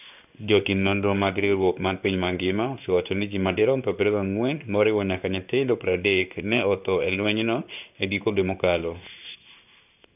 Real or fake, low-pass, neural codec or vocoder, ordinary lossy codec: fake; 3.6 kHz; codec, 16 kHz in and 24 kHz out, 1 kbps, XY-Tokenizer; none